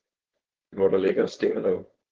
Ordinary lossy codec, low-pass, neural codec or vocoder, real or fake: Opus, 16 kbps; 7.2 kHz; codec, 16 kHz, 4.8 kbps, FACodec; fake